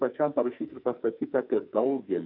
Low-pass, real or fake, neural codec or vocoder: 5.4 kHz; fake; codec, 44.1 kHz, 2.6 kbps, SNAC